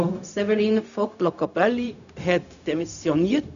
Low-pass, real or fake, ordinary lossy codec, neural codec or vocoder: 7.2 kHz; fake; none; codec, 16 kHz, 0.4 kbps, LongCat-Audio-Codec